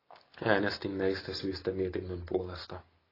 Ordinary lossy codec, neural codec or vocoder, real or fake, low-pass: AAC, 24 kbps; none; real; 5.4 kHz